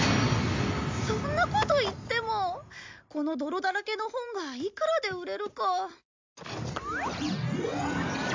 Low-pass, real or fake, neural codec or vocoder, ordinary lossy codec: 7.2 kHz; real; none; MP3, 64 kbps